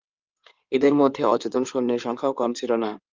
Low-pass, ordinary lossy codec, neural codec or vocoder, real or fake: 7.2 kHz; Opus, 32 kbps; codec, 16 kHz in and 24 kHz out, 2.2 kbps, FireRedTTS-2 codec; fake